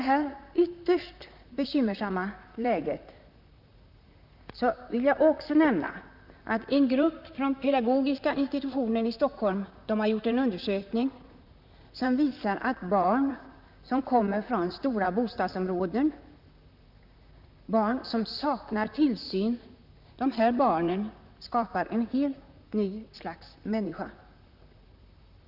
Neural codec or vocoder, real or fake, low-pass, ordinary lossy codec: vocoder, 44.1 kHz, 128 mel bands, Pupu-Vocoder; fake; 5.4 kHz; none